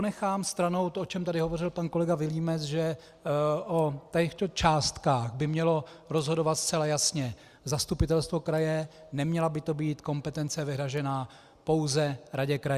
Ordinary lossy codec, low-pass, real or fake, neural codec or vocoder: Opus, 64 kbps; 14.4 kHz; real; none